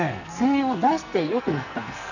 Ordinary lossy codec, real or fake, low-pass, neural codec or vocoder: none; fake; 7.2 kHz; codec, 44.1 kHz, 2.6 kbps, SNAC